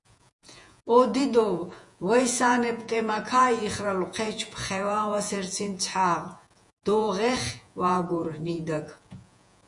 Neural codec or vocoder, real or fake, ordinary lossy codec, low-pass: vocoder, 48 kHz, 128 mel bands, Vocos; fake; MP3, 64 kbps; 10.8 kHz